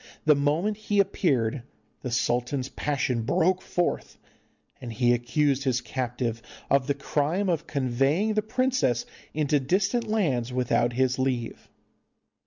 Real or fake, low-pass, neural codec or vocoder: real; 7.2 kHz; none